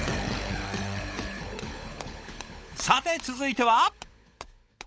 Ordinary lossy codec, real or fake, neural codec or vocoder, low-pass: none; fake; codec, 16 kHz, 16 kbps, FunCodec, trained on LibriTTS, 50 frames a second; none